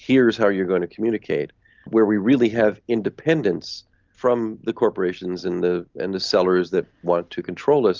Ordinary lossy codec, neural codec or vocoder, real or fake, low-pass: Opus, 24 kbps; none; real; 7.2 kHz